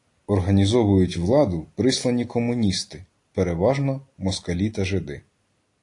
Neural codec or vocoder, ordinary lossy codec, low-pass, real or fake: none; AAC, 48 kbps; 10.8 kHz; real